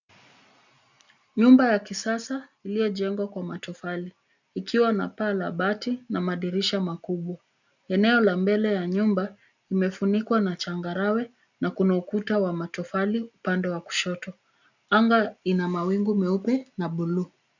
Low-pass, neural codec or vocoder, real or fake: 7.2 kHz; none; real